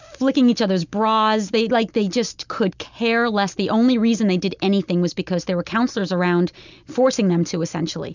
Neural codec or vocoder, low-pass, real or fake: none; 7.2 kHz; real